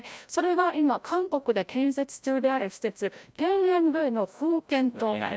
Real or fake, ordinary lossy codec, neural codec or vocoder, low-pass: fake; none; codec, 16 kHz, 0.5 kbps, FreqCodec, larger model; none